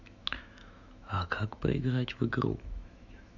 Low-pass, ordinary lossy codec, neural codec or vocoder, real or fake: 7.2 kHz; none; autoencoder, 48 kHz, 128 numbers a frame, DAC-VAE, trained on Japanese speech; fake